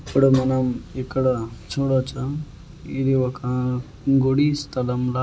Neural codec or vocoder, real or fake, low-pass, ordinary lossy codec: none; real; none; none